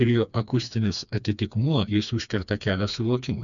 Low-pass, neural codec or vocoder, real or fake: 7.2 kHz; codec, 16 kHz, 2 kbps, FreqCodec, smaller model; fake